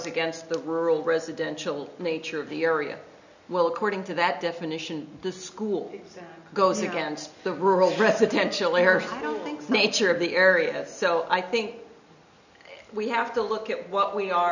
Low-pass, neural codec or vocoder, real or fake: 7.2 kHz; none; real